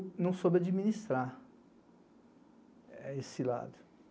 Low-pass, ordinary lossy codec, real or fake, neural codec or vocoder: none; none; real; none